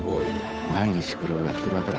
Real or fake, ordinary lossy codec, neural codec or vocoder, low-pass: fake; none; codec, 16 kHz, 8 kbps, FunCodec, trained on Chinese and English, 25 frames a second; none